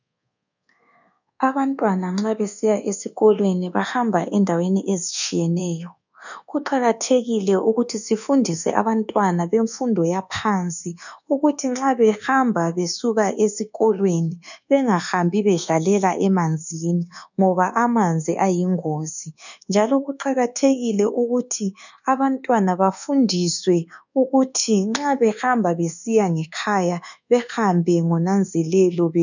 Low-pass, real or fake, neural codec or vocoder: 7.2 kHz; fake; codec, 24 kHz, 1.2 kbps, DualCodec